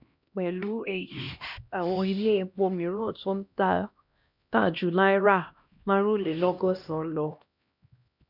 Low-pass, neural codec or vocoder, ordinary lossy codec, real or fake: 5.4 kHz; codec, 16 kHz, 1 kbps, X-Codec, HuBERT features, trained on LibriSpeech; none; fake